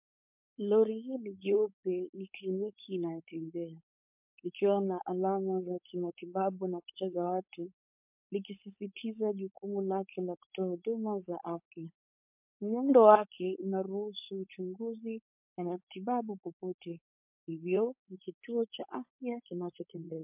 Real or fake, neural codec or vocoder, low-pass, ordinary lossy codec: fake; codec, 16 kHz, 4.8 kbps, FACodec; 3.6 kHz; MP3, 32 kbps